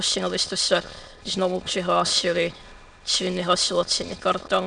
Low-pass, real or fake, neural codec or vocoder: 9.9 kHz; fake; autoencoder, 22.05 kHz, a latent of 192 numbers a frame, VITS, trained on many speakers